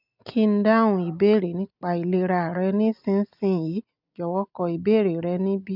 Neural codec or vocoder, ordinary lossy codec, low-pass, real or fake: none; none; 5.4 kHz; real